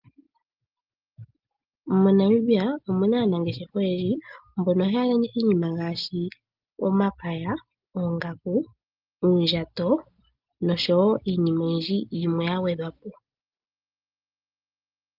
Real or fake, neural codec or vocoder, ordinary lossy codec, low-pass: real; none; Opus, 24 kbps; 5.4 kHz